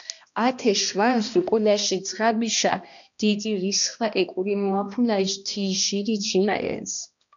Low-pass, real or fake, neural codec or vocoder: 7.2 kHz; fake; codec, 16 kHz, 1 kbps, X-Codec, HuBERT features, trained on balanced general audio